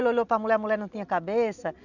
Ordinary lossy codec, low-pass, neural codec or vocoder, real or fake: none; 7.2 kHz; none; real